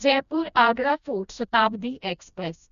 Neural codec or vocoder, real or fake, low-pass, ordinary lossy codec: codec, 16 kHz, 1 kbps, FreqCodec, smaller model; fake; 7.2 kHz; none